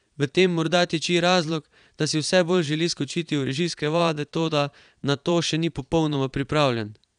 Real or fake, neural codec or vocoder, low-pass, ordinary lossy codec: fake; vocoder, 22.05 kHz, 80 mel bands, Vocos; 9.9 kHz; none